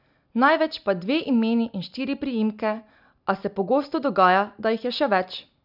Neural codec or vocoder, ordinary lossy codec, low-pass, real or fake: none; none; 5.4 kHz; real